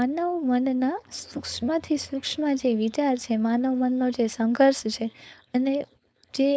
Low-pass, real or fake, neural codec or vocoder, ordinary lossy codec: none; fake; codec, 16 kHz, 4.8 kbps, FACodec; none